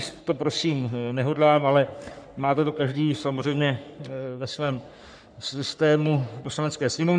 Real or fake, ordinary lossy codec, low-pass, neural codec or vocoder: fake; MP3, 96 kbps; 9.9 kHz; codec, 44.1 kHz, 3.4 kbps, Pupu-Codec